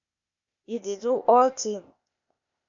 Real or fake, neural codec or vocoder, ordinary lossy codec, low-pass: fake; codec, 16 kHz, 0.8 kbps, ZipCodec; AAC, 64 kbps; 7.2 kHz